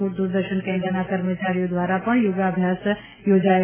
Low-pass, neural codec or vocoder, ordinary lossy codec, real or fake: 3.6 kHz; none; MP3, 16 kbps; real